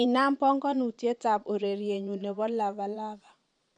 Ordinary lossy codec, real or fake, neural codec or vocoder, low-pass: none; fake; vocoder, 22.05 kHz, 80 mel bands, Vocos; 9.9 kHz